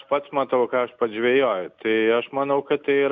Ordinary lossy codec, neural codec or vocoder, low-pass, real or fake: MP3, 64 kbps; none; 7.2 kHz; real